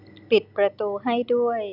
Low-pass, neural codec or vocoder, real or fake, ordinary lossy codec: 5.4 kHz; none; real; none